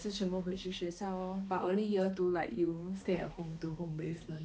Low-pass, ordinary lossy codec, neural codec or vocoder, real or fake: none; none; codec, 16 kHz, 2 kbps, X-Codec, HuBERT features, trained on balanced general audio; fake